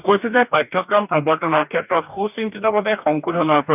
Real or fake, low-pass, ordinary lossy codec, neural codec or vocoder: fake; 3.6 kHz; none; codec, 44.1 kHz, 2.6 kbps, DAC